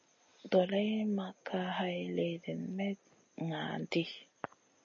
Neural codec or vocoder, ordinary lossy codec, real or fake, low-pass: none; MP3, 32 kbps; real; 7.2 kHz